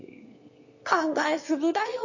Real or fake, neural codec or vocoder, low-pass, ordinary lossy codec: fake; autoencoder, 22.05 kHz, a latent of 192 numbers a frame, VITS, trained on one speaker; 7.2 kHz; MP3, 32 kbps